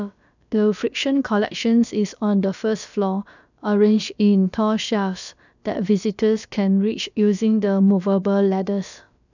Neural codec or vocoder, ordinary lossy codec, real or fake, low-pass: codec, 16 kHz, about 1 kbps, DyCAST, with the encoder's durations; none; fake; 7.2 kHz